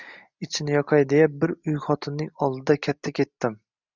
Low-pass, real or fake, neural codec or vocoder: 7.2 kHz; real; none